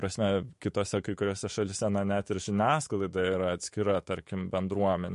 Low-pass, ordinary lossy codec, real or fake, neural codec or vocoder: 14.4 kHz; MP3, 48 kbps; fake; autoencoder, 48 kHz, 128 numbers a frame, DAC-VAE, trained on Japanese speech